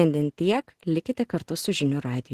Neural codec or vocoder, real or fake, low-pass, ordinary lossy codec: none; real; 14.4 kHz; Opus, 16 kbps